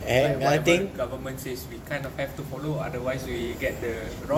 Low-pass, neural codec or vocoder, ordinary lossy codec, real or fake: 19.8 kHz; vocoder, 44.1 kHz, 128 mel bands every 512 samples, BigVGAN v2; none; fake